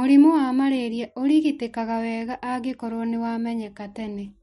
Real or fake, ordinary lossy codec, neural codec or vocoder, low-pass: real; MP3, 48 kbps; none; 19.8 kHz